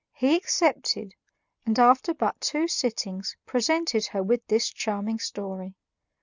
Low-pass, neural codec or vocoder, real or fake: 7.2 kHz; none; real